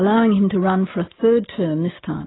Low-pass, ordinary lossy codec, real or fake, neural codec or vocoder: 7.2 kHz; AAC, 16 kbps; real; none